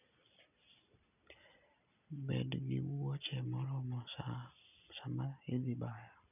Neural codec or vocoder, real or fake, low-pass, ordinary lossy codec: none; real; 3.6 kHz; none